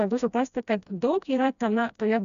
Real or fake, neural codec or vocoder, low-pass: fake; codec, 16 kHz, 1 kbps, FreqCodec, smaller model; 7.2 kHz